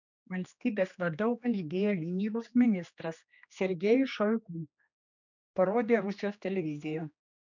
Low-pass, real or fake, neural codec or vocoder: 7.2 kHz; fake; codec, 16 kHz, 2 kbps, X-Codec, HuBERT features, trained on general audio